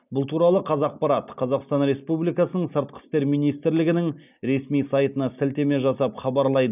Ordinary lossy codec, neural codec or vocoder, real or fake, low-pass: none; none; real; 3.6 kHz